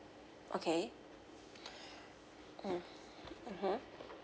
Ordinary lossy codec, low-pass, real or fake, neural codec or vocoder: none; none; real; none